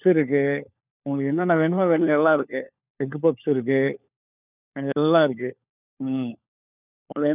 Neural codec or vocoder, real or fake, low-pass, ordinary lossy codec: codec, 16 kHz, 4 kbps, FunCodec, trained on LibriTTS, 50 frames a second; fake; 3.6 kHz; none